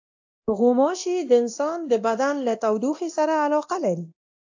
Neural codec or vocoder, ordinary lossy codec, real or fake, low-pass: codec, 24 kHz, 0.9 kbps, DualCodec; AAC, 48 kbps; fake; 7.2 kHz